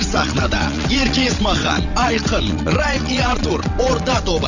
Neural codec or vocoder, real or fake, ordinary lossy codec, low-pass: vocoder, 22.05 kHz, 80 mel bands, WaveNeXt; fake; none; 7.2 kHz